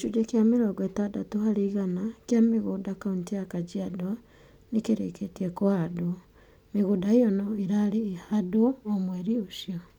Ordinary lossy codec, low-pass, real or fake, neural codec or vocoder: none; 19.8 kHz; real; none